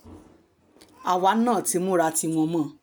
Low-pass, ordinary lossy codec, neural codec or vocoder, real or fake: none; none; none; real